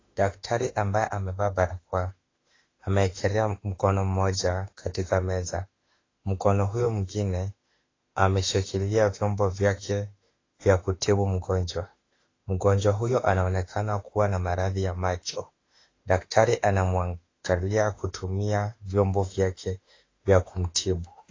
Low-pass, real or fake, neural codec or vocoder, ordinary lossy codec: 7.2 kHz; fake; autoencoder, 48 kHz, 32 numbers a frame, DAC-VAE, trained on Japanese speech; AAC, 32 kbps